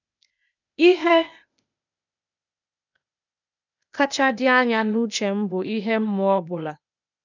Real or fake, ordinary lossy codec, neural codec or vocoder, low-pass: fake; none; codec, 16 kHz, 0.8 kbps, ZipCodec; 7.2 kHz